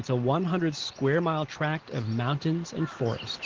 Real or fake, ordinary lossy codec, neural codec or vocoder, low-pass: real; Opus, 16 kbps; none; 7.2 kHz